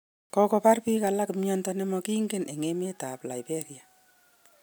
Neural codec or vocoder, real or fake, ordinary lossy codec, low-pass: none; real; none; none